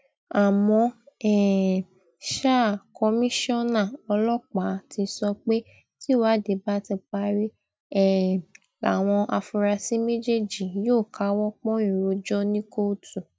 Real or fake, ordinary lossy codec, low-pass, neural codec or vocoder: real; none; none; none